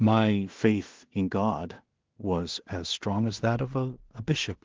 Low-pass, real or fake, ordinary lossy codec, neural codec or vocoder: 7.2 kHz; fake; Opus, 16 kbps; codec, 16 kHz in and 24 kHz out, 0.4 kbps, LongCat-Audio-Codec, two codebook decoder